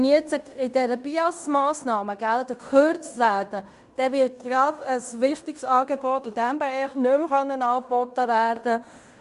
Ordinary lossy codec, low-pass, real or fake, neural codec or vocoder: Opus, 64 kbps; 10.8 kHz; fake; codec, 16 kHz in and 24 kHz out, 0.9 kbps, LongCat-Audio-Codec, fine tuned four codebook decoder